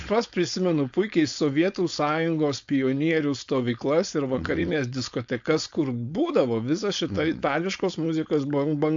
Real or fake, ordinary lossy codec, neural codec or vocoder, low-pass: fake; AAC, 64 kbps; codec, 16 kHz, 4.8 kbps, FACodec; 7.2 kHz